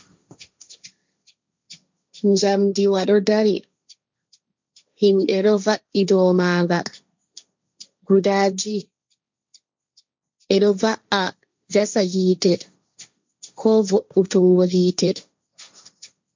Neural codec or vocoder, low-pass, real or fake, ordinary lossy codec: codec, 16 kHz, 1.1 kbps, Voila-Tokenizer; 7.2 kHz; fake; MP3, 64 kbps